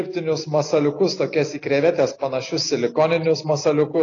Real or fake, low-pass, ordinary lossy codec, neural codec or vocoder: real; 7.2 kHz; AAC, 32 kbps; none